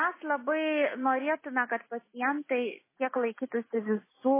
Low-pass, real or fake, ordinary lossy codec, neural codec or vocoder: 3.6 kHz; real; MP3, 16 kbps; none